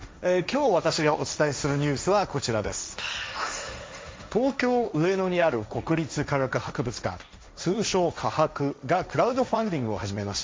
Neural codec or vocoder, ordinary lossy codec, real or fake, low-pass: codec, 16 kHz, 1.1 kbps, Voila-Tokenizer; none; fake; none